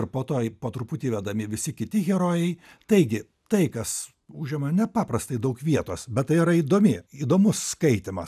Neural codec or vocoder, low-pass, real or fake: none; 14.4 kHz; real